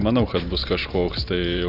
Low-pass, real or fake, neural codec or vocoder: 5.4 kHz; fake; vocoder, 44.1 kHz, 128 mel bands every 512 samples, BigVGAN v2